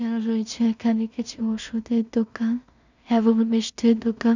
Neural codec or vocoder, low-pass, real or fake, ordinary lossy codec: codec, 16 kHz in and 24 kHz out, 0.4 kbps, LongCat-Audio-Codec, two codebook decoder; 7.2 kHz; fake; none